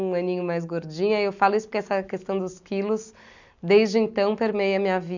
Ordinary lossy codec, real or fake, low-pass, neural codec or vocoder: none; real; 7.2 kHz; none